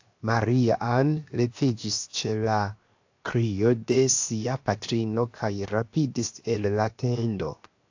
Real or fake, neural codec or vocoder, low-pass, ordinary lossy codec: fake; codec, 16 kHz, 0.7 kbps, FocalCodec; 7.2 kHz; AAC, 48 kbps